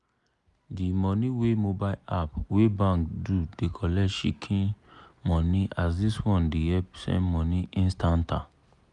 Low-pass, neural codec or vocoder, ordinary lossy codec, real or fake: 10.8 kHz; none; none; real